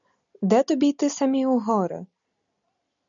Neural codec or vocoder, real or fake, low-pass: none; real; 7.2 kHz